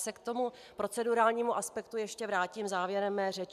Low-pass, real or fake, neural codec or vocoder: 14.4 kHz; real; none